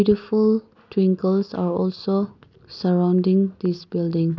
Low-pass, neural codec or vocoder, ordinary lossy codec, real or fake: 7.2 kHz; none; none; real